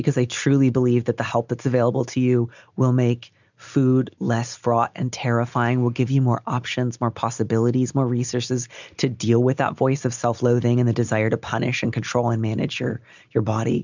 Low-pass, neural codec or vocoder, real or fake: 7.2 kHz; none; real